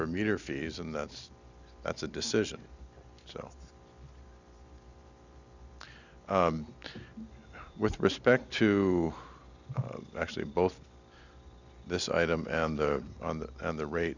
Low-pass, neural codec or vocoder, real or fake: 7.2 kHz; none; real